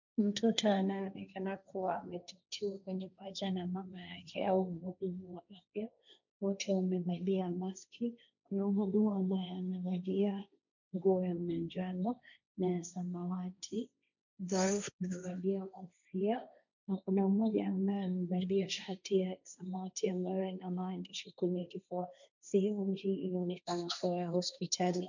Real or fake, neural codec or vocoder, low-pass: fake; codec, 16 kHz, 1.1 kbps, Voila-Tokenizer; 7.2 kHz